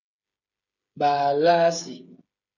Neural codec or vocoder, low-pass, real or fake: codec, 16 kHz, 8 kbps, FreqCodec, smaller model; 7.2 kHz; fake